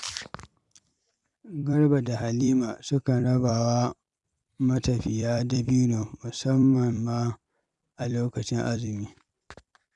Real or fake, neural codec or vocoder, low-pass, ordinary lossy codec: fake; vocoder, 44.1 kHz, 128 mel bands every 256 samples, BigVGAN v2; 10.8 kHz; none